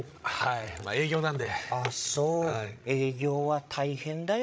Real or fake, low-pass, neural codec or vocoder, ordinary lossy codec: fake; none; codec, 16 kHz, 16 kbps, FreqCodec, larger model; none